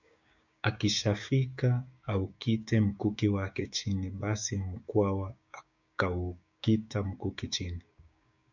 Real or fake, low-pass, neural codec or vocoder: fake; 7.2 kHz; codec, 16 kHz, 6 kbps, DAC